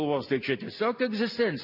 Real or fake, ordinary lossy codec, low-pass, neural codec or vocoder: real; AAC, 48 kbps; 5.4 kHz; none